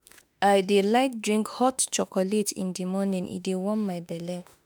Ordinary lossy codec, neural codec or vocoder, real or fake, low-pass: none; autoencoder, 48 kHz, 32 numbers a frame, DAC-VAE, trained on Japanese speech; fake; none